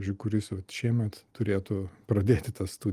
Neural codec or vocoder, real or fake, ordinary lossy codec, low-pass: none; real; Opus, 32 kbps; 14.4 kHz